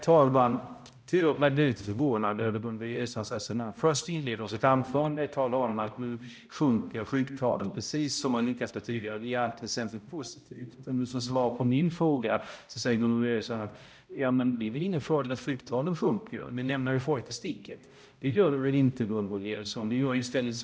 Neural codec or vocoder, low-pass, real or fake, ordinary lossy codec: codec, 16 kHz, 0.5 kbps, X-Codec, HuBERT features, trained on balanced general audio; none; fake; none